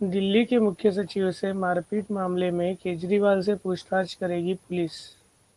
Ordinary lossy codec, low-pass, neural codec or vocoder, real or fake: Opus, 24 kbps; 10.8 kHz; none; real